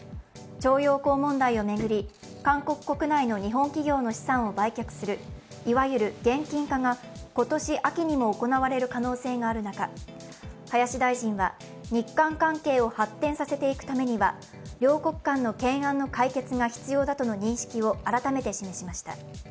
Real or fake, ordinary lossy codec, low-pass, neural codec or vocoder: real; none; none; none